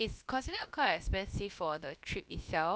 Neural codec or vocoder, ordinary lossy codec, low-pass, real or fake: codec, 16 kHz, about 1 kbps, DyCAST, with the encoder's durations; none; none; fake